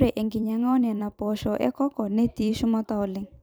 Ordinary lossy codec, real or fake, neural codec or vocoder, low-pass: none; fake; vocoder, 44.1 kHz, 128 mel bands every 256 samples, BigVGAN v2; none